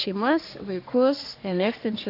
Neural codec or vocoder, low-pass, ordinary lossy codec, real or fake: codec, 44.1 kHz, 3.4 kbps, Pupu-Codec; 5.4 kHz; AAC, 32 kbps; fake